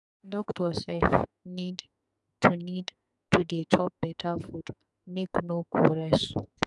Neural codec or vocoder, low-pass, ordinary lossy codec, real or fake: codec, 44.1 kHz, 2.6 kbps, SNAC; 10.8 kHz; none; fake